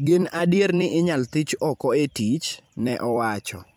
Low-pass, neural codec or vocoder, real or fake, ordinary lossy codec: none; vocoder, 44.1 kHz, 128 mel bands every 256 samples, BigVGAN v2; fake; none